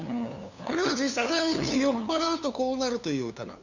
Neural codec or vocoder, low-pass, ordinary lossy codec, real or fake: codec, 16 kHz, 2 kbps, FunCodec, trained on LibriTTS, 25 frames a second; 7.2 kHz; none; fake